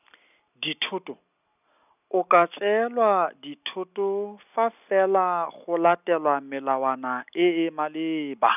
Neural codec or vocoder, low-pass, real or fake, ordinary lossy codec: none; 3.6 kHz; real; none